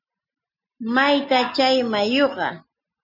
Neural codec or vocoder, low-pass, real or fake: none; 5.4 kHz; real